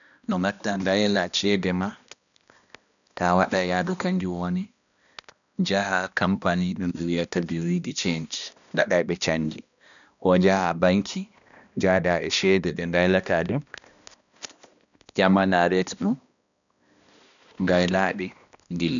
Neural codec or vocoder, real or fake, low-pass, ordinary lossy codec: codec, 16 kHz, 1 kbps, X-Codec, HuBERT features, trained on balanced general audio; fake; 7.2 kHz; none